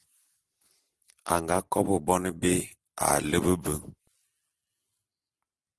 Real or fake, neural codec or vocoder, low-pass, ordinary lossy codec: real; none; 10.8 kHz; Opus, 16 kbps